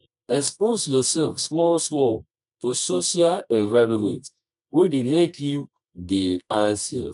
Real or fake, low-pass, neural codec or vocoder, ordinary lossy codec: fake; 10.8 kHz; codec, 24 kHz, 0.9 kbps, WavTokenizer, medium music audio release; none